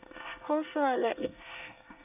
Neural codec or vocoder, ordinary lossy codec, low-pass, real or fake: codec, 24 kHz, 1 kbps, SNAC; none; 3.6 kHz; fake